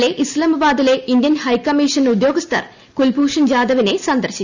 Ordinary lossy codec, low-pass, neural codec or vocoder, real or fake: Opus, 64 kbps; 7.2 kHz; none; real